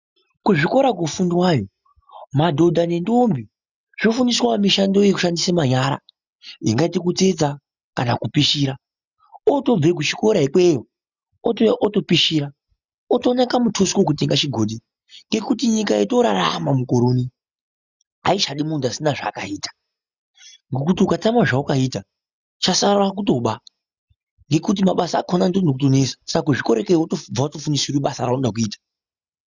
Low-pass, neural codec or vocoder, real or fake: 7.2 kHz; none; real